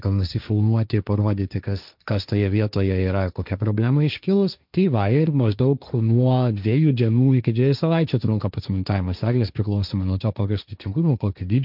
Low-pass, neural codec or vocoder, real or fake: 5.4 kHz; codec, 16 kHz, 1.1 kbps, Voila-Tokenizer; fake